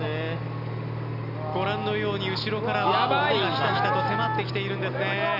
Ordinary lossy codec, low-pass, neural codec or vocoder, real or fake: none; 5.4 kHz; none; real